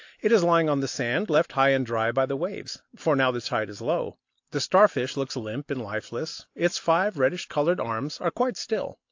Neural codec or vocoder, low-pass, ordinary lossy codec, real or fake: none; 7.2 kHz; AAC, 48 kbps; real